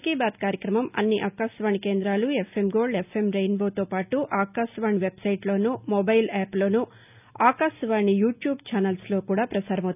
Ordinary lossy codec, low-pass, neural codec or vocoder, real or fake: none; 3.6 kHz; none; real